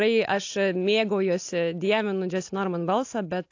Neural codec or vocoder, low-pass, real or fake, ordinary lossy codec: none; 7.2 kHz; real; AAC, 48 kbps